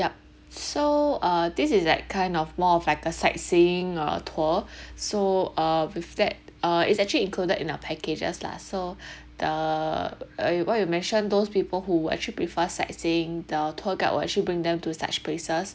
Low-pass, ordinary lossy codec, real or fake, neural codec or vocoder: none; none; real; none